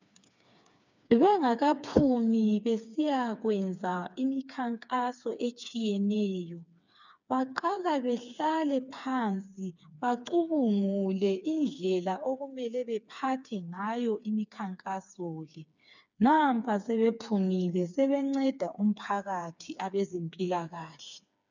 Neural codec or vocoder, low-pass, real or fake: codec, 16 kHz, 4 kbps, FreqCodec, smaller model; 7.2 kHz; fake